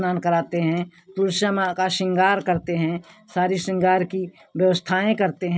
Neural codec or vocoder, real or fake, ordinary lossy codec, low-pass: none; real; none; none